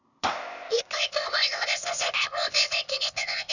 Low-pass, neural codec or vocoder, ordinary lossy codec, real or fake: 7.2 kHz; codec, 16 kHz, 0.8 kbps, ZipCodec; none; fake